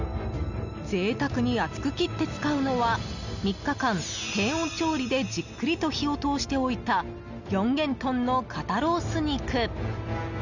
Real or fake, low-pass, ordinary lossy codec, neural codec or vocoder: real; 7.2 kHz; none; none